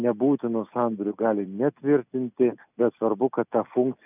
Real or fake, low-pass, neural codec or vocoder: real; 3.6 kHz; none